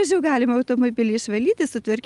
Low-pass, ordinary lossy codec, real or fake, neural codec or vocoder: 10.8 kHz; Opus, 32 kbps; real; none